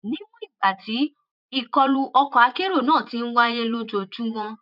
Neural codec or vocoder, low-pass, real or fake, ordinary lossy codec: vocoder, 44.1 kHz, 80 mel bands, Vocos; 5.4 kHz; fake; none